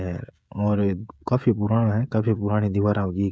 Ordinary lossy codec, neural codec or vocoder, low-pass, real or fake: none; codec, 16 kHz, 16 kbps, FreqCodec, smaller model; none; fake